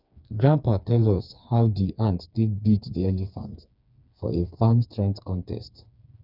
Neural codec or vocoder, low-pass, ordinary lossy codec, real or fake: codec, 16 kHz, 4 kbps, FreqCodec, smaller model; 5.4 kHz; none; fake